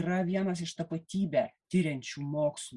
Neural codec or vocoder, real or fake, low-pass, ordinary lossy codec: none; real; 10.8 kHz; Opus, 64 kbps